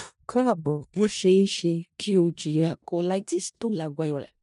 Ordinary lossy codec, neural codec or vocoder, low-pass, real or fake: none; codec, 16 kHz in and 24 kHz out, 0.4 kbps, LongCat-Audio-Codec, four codebook decoder; 10.8 kHz; fake